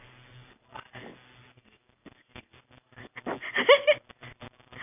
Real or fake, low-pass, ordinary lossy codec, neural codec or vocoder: real; 3.6 kHz; none; none